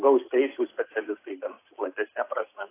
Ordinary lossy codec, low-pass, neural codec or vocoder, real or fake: AAC, 24 kbps; 3.6 kHz; none; real